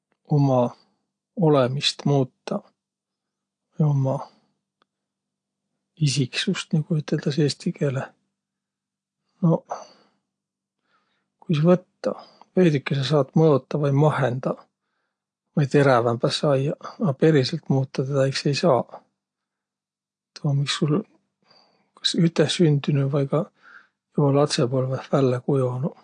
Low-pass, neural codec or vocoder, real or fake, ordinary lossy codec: 9.9 kHz; none; real; AAC, 48 kbps